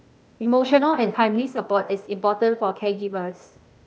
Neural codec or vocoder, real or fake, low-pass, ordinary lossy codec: codec, 16 kHz, 0.8 kbps, ZipCodec; fake; none; none